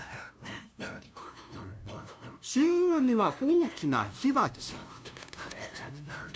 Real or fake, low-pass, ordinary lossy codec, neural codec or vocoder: fake; none; none; codec, 16 kHz, 0.5 kbps, FunCodec, trained on LibriTTS, 25 frames a second